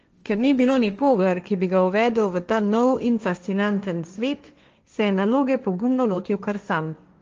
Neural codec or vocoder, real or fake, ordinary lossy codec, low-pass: codec, 16 kHz, 1.1 kbps, Voila-Tokenizer; fake; Opus, 24 kbps; 7.2 kHz